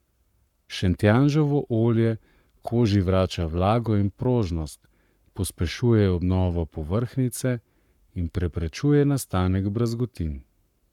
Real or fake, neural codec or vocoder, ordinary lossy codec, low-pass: fake; codec, 44.1 kHz, 7.8 kbps, Pupu-Codec; none; 19.8 kHz